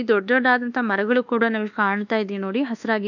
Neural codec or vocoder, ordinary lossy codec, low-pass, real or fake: codec, 24 kHz, 1.2 kbps, DualCodec; none; 7.2 kHz; fake